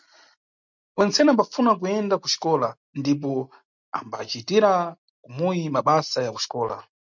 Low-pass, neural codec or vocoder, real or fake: 7.2 kHz; none; real